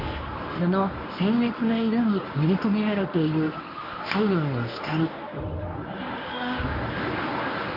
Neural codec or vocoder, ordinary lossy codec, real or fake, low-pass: codec, 24 kHz, 0.9 kbps, WavTokenizer, medium speech release version 1; AAC, 48 kbps; fake; 5.4 kHz